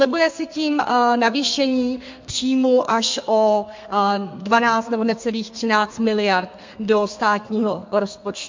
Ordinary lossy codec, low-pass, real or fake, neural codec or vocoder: MP3, 48 kbps; 7.2 kHz; fake; codec, 32 kHz, 1.9 kbps, SNAC